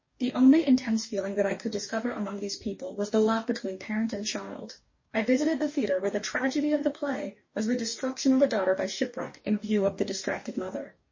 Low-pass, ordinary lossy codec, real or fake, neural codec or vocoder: 7.2 kHz; MP3, 32 kbps; fake; codec, 44.1 kHz, 2.6 kbps, DAC